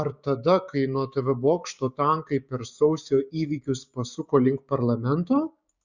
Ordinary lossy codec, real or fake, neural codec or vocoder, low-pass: Opus, 64 kbps; fake; codec, 16 kHz, 6 kbps, DAC; 7.2 kHz